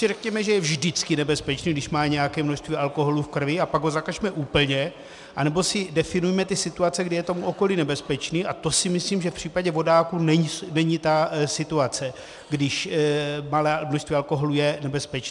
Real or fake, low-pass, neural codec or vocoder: real; 10.8 kHz; none